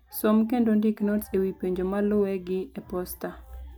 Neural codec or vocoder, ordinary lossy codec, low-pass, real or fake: none; none; none; real